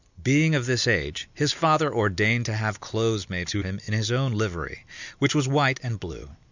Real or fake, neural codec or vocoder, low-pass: real; none; 7.2 kHz